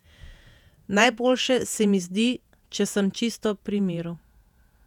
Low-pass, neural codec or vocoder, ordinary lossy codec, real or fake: 19.8 kHz; vocoder, 48 kHz, 128 mel bands, Vocos; none; fake